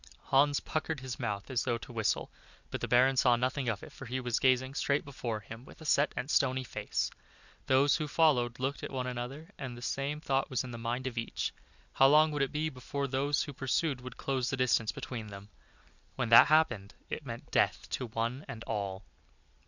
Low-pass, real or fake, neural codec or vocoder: 7.2 kHz; real; none